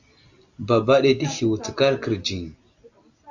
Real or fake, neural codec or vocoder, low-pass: real; none; 7.2 kHz